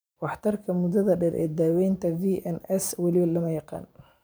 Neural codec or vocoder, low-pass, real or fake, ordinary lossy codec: vocoder, 44.1 kHz, 128 mel bands every 512 samples, BigVGAN v2; none; fake; none